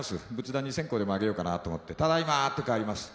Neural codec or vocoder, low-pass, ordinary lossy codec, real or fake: none; none; none; real